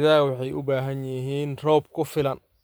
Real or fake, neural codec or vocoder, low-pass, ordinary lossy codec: fake; vocoder, 44.1 kHz, 128 mel bands every 512 samples, BigVGAN v2; none; none